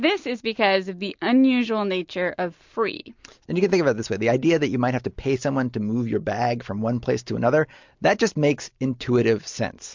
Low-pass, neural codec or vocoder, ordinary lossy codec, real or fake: 7.2 kHz; none; MP3, 64 kbps; real